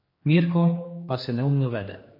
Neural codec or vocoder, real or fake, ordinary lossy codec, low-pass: codec, 16 kHz, 2 kbps, X-Codec, HuBERT features, trained on general audio; fake; MP3, 24 kbps; 5.4 kHz